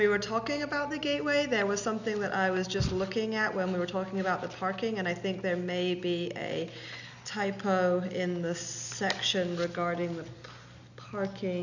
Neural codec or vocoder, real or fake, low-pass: none; real; 7.2 kHz